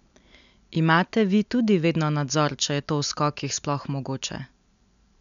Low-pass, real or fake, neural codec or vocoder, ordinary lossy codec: 7.2 kHz; real; none; MP3, 96 kbps